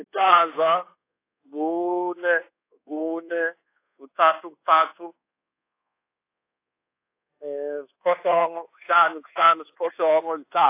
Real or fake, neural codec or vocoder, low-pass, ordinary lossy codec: fake; codec, 24 kHz, 1.2 kbps, DualCodec; 3.6 kHz; AAC, 24 kbps